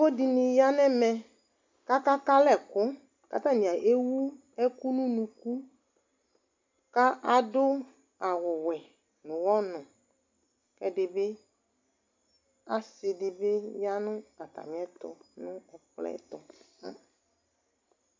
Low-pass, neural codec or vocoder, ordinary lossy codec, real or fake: 7.2 kHz; none; MP3, 64 kbps; real